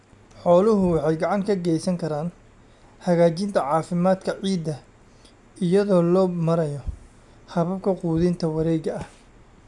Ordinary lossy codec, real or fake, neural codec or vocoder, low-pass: MP3, 96 kbps; real; none; 10.8 kHz